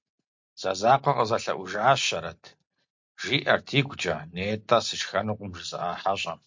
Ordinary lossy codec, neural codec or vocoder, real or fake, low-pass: MP3, 48 kbps; none; real; 7.2 kHz